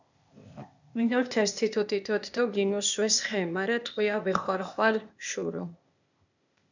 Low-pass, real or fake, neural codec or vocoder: 7.2 kHz; fake; codec, 16 kHz, 0.8 kbps, ZipCodec